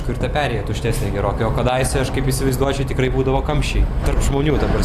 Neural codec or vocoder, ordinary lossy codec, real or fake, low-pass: none; Opus, 64 kbps; real; 14.4 kHz